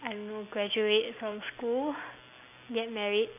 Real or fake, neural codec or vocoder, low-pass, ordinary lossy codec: real; none; 3.6 kHz; none